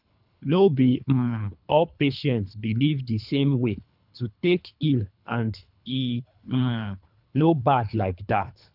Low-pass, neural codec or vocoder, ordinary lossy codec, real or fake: 5.4 kHz; codec, 24 kHz, 3 kbps, HILCodec; none; fake